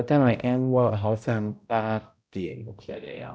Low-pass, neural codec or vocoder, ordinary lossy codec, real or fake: none; codec, 16 kHz, 0.5 kbps, X-Codec, HuBERT features, trained on balanced general audio; none; fake